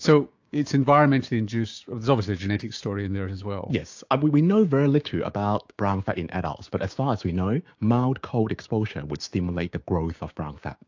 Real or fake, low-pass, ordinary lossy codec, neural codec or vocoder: fake; 7.2 kHz; AAC, 48 kbps; codec, 16 kHz, 6 kbps, DAC